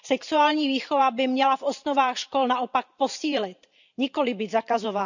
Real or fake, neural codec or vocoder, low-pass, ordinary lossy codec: fake; vocoder, 44.1 kHz, 128 mel bands every 512 samples, BigVGAN v2; 7.2 kHz; none